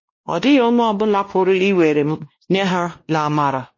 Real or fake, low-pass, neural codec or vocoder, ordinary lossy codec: fake; 7.2 kHz; codec, 16 kHz, 1 kbps, X-Codec, WavLM features, trained on Multilingual LibriSpeech; MP3, 32 kbps